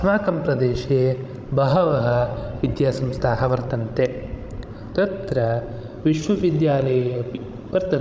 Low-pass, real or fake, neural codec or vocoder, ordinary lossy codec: none; fake; codec, 16 kHz, 16 kbps, FreqCodec, larger model; none